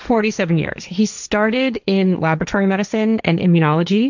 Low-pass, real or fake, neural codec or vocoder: 7.2 kHz; fake; codec, 16 kHz, 1.1 kbps, Voila-Tokenizer